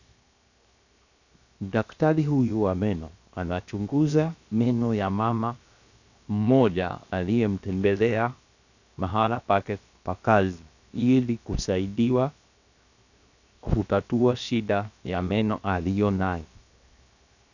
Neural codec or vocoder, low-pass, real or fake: codec, 16 kHz, 0.7 kbps, FocalCodec; 7.2 kHz; fake